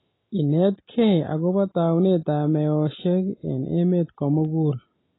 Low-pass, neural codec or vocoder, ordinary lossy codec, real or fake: 7.2 kHz; none; AAC, 16 kbps; real